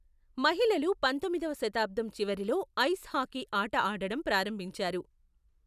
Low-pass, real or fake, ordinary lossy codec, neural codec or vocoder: 14.4 kHz; real; none; none